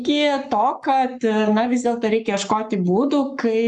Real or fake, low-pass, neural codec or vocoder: fake; 10.8 kHz; codec, 44.1 kHz, 7.8 kbps, Pupu-Codec